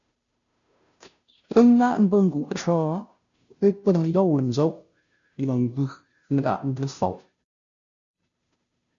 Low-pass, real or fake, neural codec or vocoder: 7.2 kHz; fake; codec, 16 kHz, 0.5 kbps, FunCodec, trained on Chinese and English, 25 frames a second